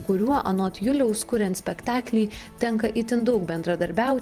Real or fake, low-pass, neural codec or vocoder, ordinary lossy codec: fake; 14.4 kHz; vocoder, 44.1 kHz, 128 mel bands every 512 samples, BigVGAN v2; Opus, 32 kbps